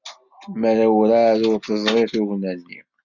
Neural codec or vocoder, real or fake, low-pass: none; real; 7.2 kHz